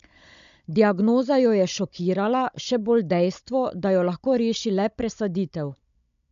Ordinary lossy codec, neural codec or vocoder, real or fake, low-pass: MP3, 64 kbps; codec, 16 kHz, 16 kbps, FunCodec, trained on Chinese and English, 50 frames a second; fake; 7.2 kHz